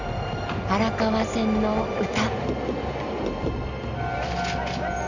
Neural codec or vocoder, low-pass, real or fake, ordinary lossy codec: none; 7.2 kHz; real; none